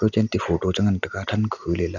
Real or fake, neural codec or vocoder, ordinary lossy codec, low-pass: real; none; none; 7.2 kHz